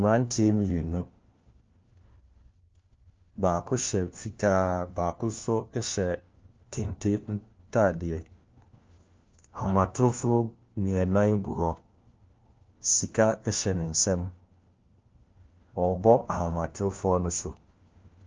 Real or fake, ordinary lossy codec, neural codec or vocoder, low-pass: fake; Opus, 24 kbps; codec, 16 kHz, 1 kbps, FunCodec, trained on LibriTTS, 50 frames a second; 7.2 kHz